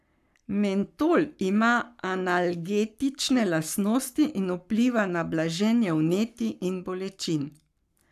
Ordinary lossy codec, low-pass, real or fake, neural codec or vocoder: none; 14.4 kHz; fake; codec, 44.1 kHz, 7.8 kbps, Pupu-Codec